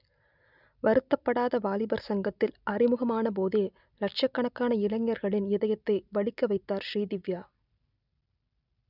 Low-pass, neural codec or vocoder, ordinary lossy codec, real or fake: 5.4 kHz; none; none; real